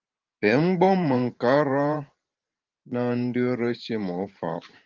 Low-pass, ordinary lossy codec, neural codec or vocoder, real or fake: 7.2 kHz; Opus, 32 kbps; vocoder, 44.1 kHz, 128 mel bands every 512 samples, BigVGAN v2; fake